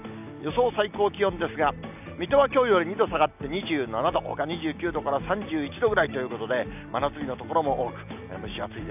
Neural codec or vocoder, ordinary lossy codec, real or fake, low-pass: none; none; real; 3.6 kHz